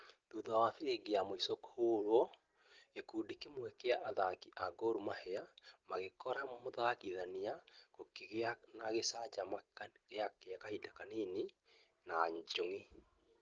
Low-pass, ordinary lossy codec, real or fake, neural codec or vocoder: 7.2 kHz; Opus, 16 kbps; real; none